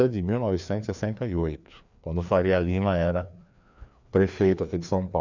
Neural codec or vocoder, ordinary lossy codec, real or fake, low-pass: codec, 16 kHz, 2 kbps, FreqCodec, larger model; none; fake; 7.2 kHz